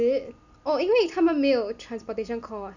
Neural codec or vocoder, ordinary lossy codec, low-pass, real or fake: none; none; 7.2 kHz; real